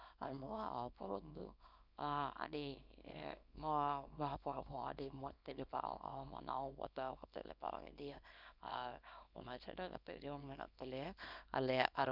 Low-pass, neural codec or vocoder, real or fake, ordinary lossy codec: 5.4 kHz; codec, 24 kHz, 0.9 kbps, WavTokenizer, small release; fake; none